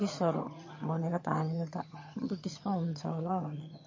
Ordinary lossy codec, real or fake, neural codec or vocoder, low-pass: MP3, 32 kbps; fake; vocoder, 22.05 kHz, 80 mel bands, HiFi-GAN; 7.2 kHz